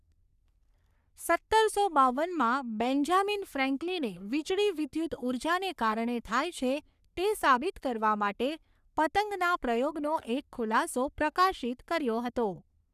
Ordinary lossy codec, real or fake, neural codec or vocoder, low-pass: none; fake; codec, 44.1 kHz, 3.4 kbps, Pupu-Codec; 14.4 kHz